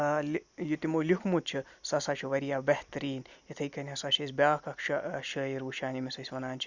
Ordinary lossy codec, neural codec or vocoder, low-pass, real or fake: Opus, 64 kbps; none; 7.2 kHz; real